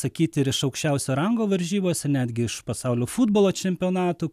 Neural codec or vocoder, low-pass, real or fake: none; 14.4 kHz; real